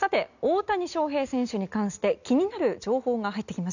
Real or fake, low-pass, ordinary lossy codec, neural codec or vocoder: real; 7.2 kHz; none; none